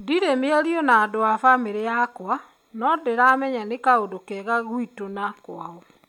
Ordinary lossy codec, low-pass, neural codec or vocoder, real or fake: none; 19.8 kHz; none; real